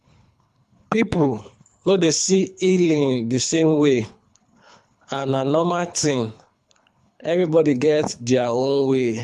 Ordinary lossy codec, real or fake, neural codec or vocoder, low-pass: none; fake; codec, 24 kHz, 3 kbps, HILCodec; none